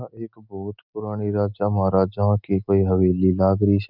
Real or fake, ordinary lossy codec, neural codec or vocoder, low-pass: real; none; none; 5.4 kHz